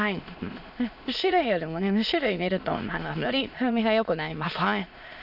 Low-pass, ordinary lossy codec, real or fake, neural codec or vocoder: 5.4 kHz; none; fake; autoencoder, 22.05 kHz, a latent of 192 numbers a frame, VITS, trained on many speakers